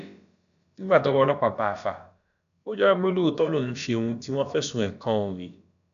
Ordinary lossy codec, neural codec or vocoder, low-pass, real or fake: AAC, 96 kbps; codec, 16 kHz, about 1 kbps, DyCAST, with the encoder's durations; 7.2 kHz; fake